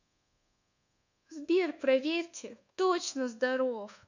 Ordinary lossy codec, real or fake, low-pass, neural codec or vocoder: none; fake; 7.2 kHz; codec, 24 kHz, 1.2 kbps, DualCodec